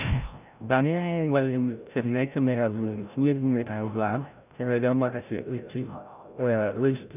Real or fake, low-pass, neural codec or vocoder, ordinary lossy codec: fake; 3.6 kHz; codec, 16 kHz, 0.5 kbps, FreqCodec, larger model; none